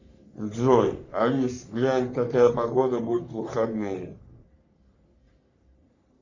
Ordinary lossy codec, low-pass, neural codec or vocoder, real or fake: Opus, 64 kbps; 7.2 kHz; codec, 44.1 kHz, 3.4 kbps, Pupu-Codec; fake